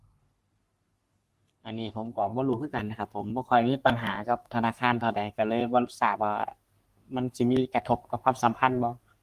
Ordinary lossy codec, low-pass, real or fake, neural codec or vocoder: Opus, 32 kbps; 14.4 kHz; fake; codec, 44.1 kHz, 3.4 kbps, Pupu-Codec